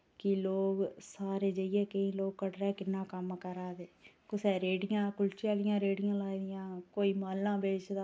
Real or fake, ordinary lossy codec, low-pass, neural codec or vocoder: real; none; none; none